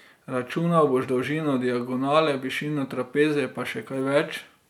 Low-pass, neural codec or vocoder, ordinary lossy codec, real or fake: 19.8 kHz; none; none; real